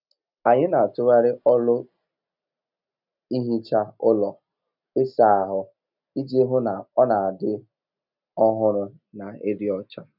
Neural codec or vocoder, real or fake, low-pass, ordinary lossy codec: none; real; 5.4 kHz; none